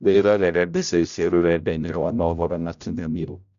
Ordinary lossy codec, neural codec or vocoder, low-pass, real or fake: none; codec, 16 kHz, 0.5 kbps, X-Codec, HuBERT features, trained on general audio; 7.2 kHz; fake